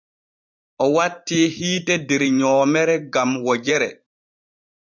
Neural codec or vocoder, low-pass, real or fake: vocoder, 44.1 kHz, 128 mel bands every 512 samples, BigVGAN v2; 7.2 kHz; fake